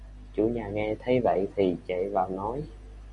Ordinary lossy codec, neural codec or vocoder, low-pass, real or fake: MP3, 64 kbps; none; 10.8 kHz; real